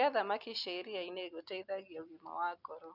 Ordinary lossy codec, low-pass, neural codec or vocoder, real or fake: none; 5.4 kHz; none; real